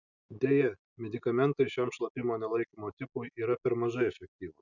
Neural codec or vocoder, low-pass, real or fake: none; 7.2 kHz; real